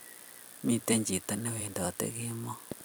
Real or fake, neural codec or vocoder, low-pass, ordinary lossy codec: fake; vocoder, 44.1 kHz, 128 mel bands every 256 samples, BigVGAN v2; none; none